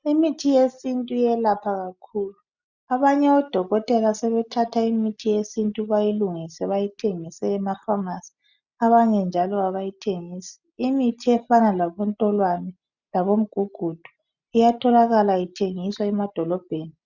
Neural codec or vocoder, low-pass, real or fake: none; 7.2 kHz; real